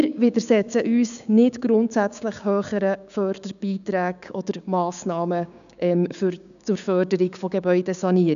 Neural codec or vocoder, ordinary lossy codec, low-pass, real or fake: codec, 16 kHz, 6 kbps, DAC; none; 7.2 kHz; fake